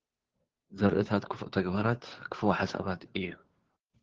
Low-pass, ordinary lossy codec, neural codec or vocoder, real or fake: 7.2 kHz; Opus, 16 kbps; codec, 16 kHz, 2 kbps, FunCodec, trained on Chinese and English, 25 frames a second; fake